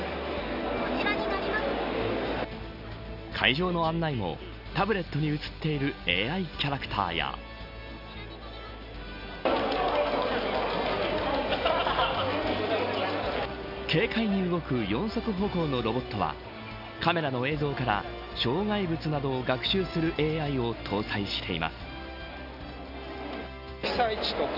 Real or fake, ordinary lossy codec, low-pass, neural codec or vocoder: real; none; 5.4 kHz; none